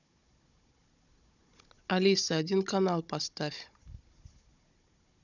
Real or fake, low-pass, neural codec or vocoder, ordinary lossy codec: fake; 7.2 kHz; codec, 16 kHz, 16 kbps, FunCodec, trained on Chinese and English, 50 frames a second; none